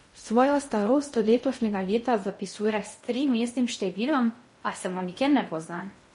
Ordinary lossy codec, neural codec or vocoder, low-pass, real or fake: MP3, 48 kbps; codec, 16 kHz in and 24 kHz out, 0.6 kbps, FocalCodec, streaming, 2048 codes; 10.8 kHz; fake